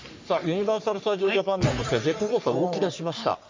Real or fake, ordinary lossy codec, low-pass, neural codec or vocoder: fake; MP3, 64 kbps; 7.2 kHz; codec, 44.1 kHz, 3.4 kbps, Pupu-Codec